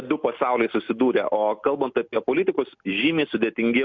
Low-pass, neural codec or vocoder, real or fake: 7.2 kHz; none; real